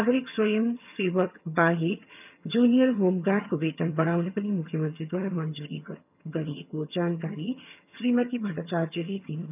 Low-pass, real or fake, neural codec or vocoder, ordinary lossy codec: 3.6 kHz; fake; vocoder, 22.05 kHz, 80 mel bands, HiFi-GAN; none